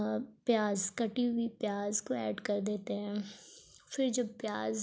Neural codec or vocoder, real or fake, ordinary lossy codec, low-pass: none; real; none; none